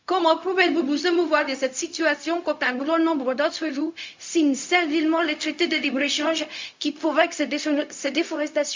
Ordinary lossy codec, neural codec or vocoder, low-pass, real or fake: MP3, 64 kbps; codec, 16 kHz, 0.4 kbps, LongCat-Audio-Codec; 7.2 kHz; fake